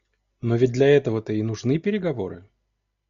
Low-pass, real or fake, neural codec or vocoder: 7.2 kHz; real; none